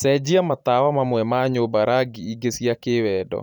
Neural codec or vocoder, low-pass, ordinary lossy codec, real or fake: vocoder, 44.1 kHz, 128 mel bands every 512 samples, BigVGAN v2; 19.8 kHz; none; fake